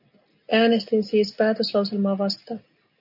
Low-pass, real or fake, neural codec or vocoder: 5.4 kHz; real; none